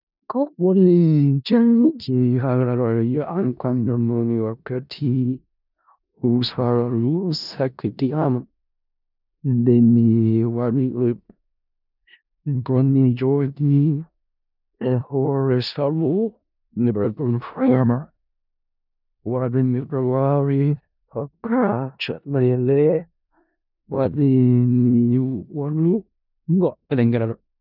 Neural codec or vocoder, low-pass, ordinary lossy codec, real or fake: codec, 16 kHz in and 24 kHz out, 0.4 kbps, LongCat-Audio-Codec, four codebook decoder; 5.4 kHz; none; fake